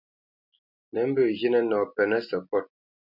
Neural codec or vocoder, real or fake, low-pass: none; real; 5.4 kHz